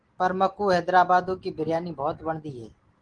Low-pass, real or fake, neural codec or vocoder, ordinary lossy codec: 9.9 kHz; real; none; Opus, 16 kbps